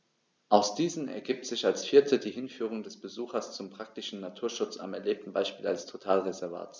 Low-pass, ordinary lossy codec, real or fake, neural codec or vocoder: none; none; real; none